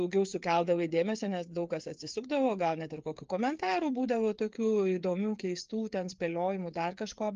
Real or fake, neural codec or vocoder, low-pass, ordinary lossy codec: fake; codec, 16 kHz, 8 kbps, FreqCodec, smaller model; 7.2 kHz; Opus, 24 kbps